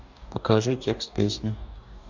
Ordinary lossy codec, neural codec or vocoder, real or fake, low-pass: MP3, 64 kbps; codec, 44.1 kHz, 2.6 kbps, DAC; fake; 7.2 kHz